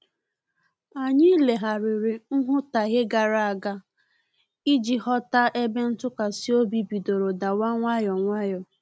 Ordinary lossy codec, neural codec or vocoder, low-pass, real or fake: none; none; none; real